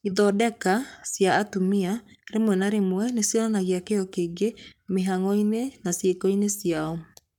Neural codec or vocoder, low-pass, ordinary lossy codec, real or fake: codec, 44.1 kHz, 7.8 kbps, Pupu-Codec; 19.8 kHz; none; fake